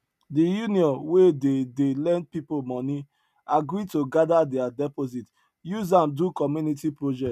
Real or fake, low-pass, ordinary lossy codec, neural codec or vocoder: real; 14.4 kHz; none; none